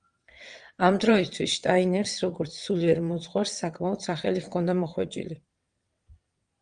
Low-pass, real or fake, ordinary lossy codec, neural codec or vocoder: 9.9 kHz; fake; Opus, 32 kbps; vocoder, 22.05 kHz, 80 mel bands, WaveNeXt